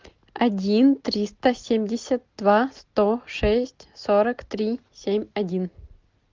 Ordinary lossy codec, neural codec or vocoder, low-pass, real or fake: Opus, 32 kbps; none; 7.2 kHz; real